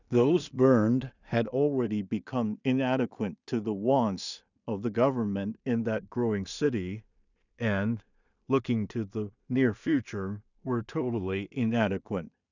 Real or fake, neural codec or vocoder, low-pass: fake; codec, 16 kHz in and 24 kHz out, 0.4 kbps, LongCat-Audio-Codec, two codebook decoder; 7.2 kHz